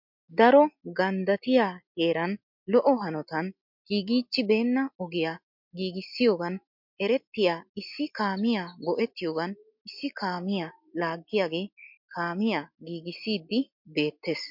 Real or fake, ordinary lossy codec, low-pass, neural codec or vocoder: real; MP3, 48 kbps; 5.4 kHz; none